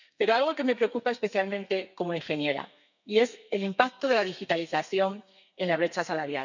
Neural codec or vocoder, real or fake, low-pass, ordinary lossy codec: codec, 32 kHz, 1.9 kbps, SNAC; fake; 7.2 kHz; none